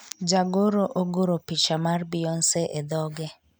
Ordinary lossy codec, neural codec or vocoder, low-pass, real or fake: none; none; none; real